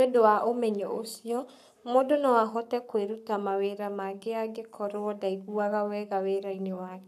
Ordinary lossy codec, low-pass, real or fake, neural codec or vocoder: none; 14.4 kHz; fake; codec, 44.1 kHz, 7.8 kbps, Pupu-Codec